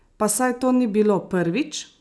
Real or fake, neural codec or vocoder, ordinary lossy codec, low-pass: real; none; none; none